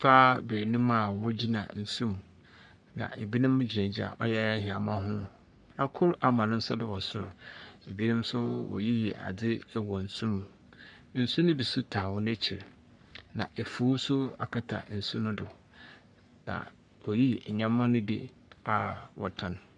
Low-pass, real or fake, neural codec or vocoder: 10.8 kHz; fake; codec, 44.1 kHz, 3.4 kbps, Pupu-Codec